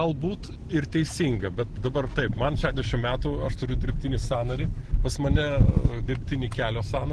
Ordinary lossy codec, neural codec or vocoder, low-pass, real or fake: Opus, 16 kbps; none; 10.8 kHz; real